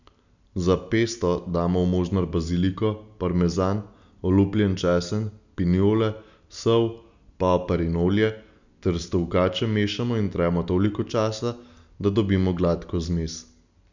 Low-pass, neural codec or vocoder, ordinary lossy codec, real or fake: 7.2 kHz; none; none; real